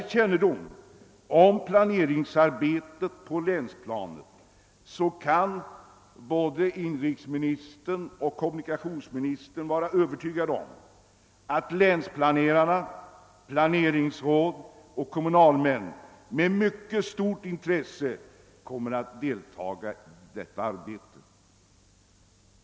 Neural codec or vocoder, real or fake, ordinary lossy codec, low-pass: none; real; none; none